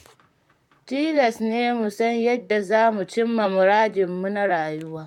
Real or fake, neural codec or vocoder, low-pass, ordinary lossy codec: fake; vocoder, 44.1 kHz, 128 mel bands, Pupu-Vocoder; 19.8 kHz; MP3, 96 kbps